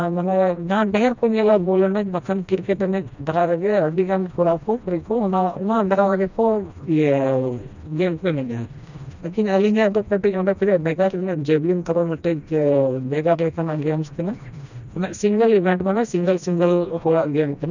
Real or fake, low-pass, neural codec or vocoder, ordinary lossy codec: fake; 7.2 kHz; codec, 16 kHz, 1 kbps, FreqCodec, smaller model; none